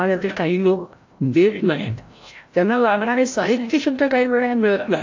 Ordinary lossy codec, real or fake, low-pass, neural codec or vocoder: AAC, 48 kbps; fake; 7.2 kHz; codec, 16 kHz, 0.5 kbps, FreqCodec, larger model